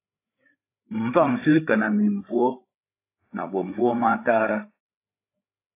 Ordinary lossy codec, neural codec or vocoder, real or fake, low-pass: AAC, 24 kbps; codec, 16 kHz, 8 kbps, FreqCodec, larger model; fake; 3.6 kHz